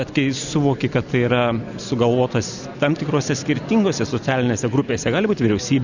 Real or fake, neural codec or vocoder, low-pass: real; none; 7.2 kHz